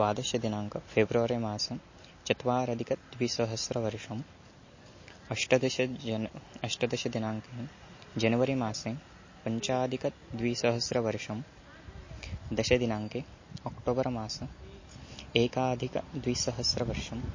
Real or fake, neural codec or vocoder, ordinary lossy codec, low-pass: real; none; MP3, 32 kbps; 7.2 kHz